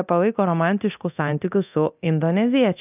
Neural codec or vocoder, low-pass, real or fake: codec, 24 kHz, 0.9 kbps, DualCodec; 3.6 kHz; fake